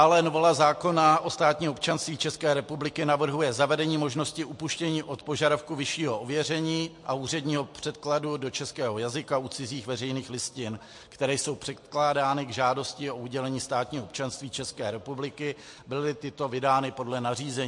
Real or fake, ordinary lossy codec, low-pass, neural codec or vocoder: real; MP3, 48 kbps; 10.8 kHz; none